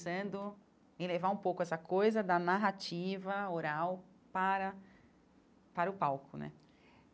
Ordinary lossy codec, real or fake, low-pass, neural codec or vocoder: none; real; none; none